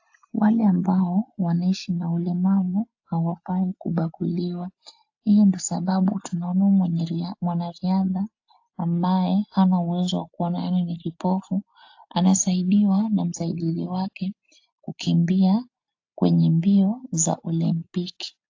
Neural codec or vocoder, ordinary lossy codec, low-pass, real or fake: none; AAC, 48 kbps; 7.2 kHz; real